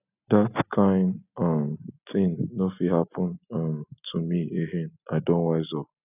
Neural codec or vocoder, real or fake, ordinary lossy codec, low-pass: none; real; none; 3.6 kHz